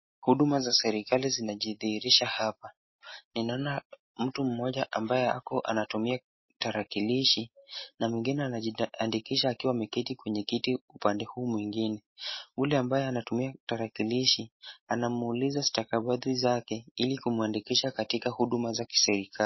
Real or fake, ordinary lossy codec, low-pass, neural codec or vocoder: real; MP3, 24 kbps; 7.2 kHz; none